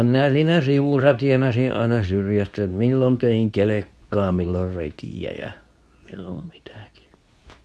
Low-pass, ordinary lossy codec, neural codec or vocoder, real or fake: none; none; codec, 24 kHz, 0.9 kbps, WavTokenizer, medium speech release version 2; fake